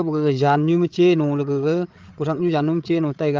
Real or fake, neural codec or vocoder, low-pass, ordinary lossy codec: fake; codec, 16 kHz, 8 kbps, FreqCodec, larger model; 7.2 kHz; Opus, 32 kbps